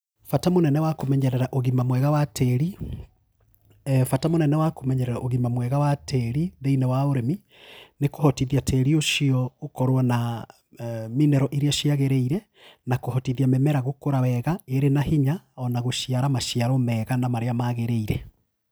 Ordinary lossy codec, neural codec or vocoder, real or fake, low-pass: none; none; real; none